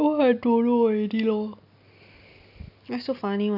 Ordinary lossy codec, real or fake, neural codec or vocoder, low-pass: none; real; none; 5.4 kHz